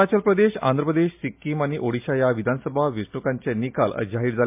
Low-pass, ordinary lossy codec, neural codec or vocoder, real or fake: 3.6 kHz; MP3, 32 kbps; none; real